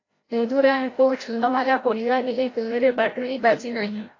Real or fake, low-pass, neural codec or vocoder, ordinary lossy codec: fake; 7.2 kHz; codec, 16 kHz, 0.5 kbps, FreqCodec, larger model; AAC, 32 kbps